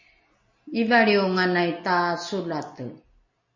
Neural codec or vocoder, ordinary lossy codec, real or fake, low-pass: none; MP3, 32 kbps; real; 7.2 kHz